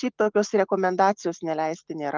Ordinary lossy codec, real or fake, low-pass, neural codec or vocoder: Opus, 24 kbps; real; 7.2 kHz; none